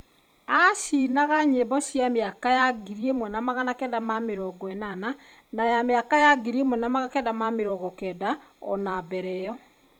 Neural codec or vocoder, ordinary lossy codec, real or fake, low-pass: vocoder, 44.1 kHz, 128 mel bands every 512 samples, BigVGAN v2; none; fake; 19.8 kHz